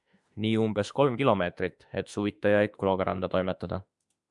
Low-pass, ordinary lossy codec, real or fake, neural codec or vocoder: 10.8 kHz; MP3, 96 kbps; fake; autoencoder, 48 kHz, 32 numbers a frame, DAC-VAE, trained on Japanese speech